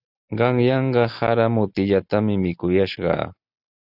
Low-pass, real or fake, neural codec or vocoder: 5.4 kHz; real; none